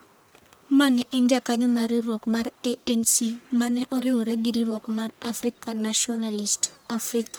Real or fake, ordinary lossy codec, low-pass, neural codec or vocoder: fake; none; none; codec, 44.1 kHz, 1.7 kbps, Pupu-Codec